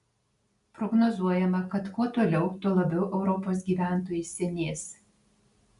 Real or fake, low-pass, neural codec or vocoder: real; 10.8 kHz; none